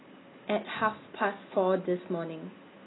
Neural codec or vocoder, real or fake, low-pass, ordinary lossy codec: none; real; 7.2 kHz; AAC, 16 kbps